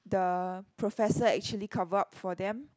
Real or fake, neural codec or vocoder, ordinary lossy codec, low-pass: real; none; none; none